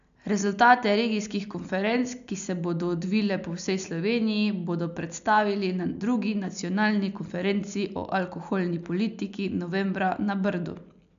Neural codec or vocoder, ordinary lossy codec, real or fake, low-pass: none; AAC, 96 kbps; real; 7.2 kHz